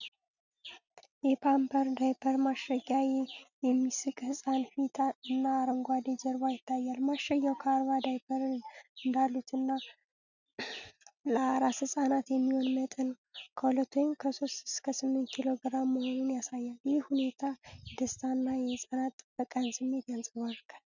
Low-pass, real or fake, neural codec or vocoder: 7.2 kHz; real; none